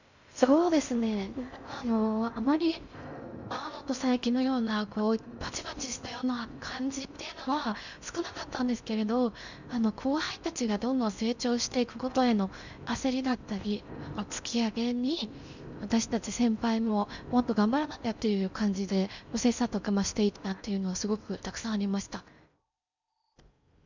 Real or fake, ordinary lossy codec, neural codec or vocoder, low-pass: fake; none; codec, 16 kHz in and 24 kHz out, 0.6 kbps, FocalCodec, streaming, 4096 codes; 7.2 kHz